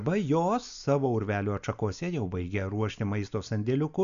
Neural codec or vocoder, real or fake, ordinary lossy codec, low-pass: none; real; MP3, 96 kbps; 7.2 kHz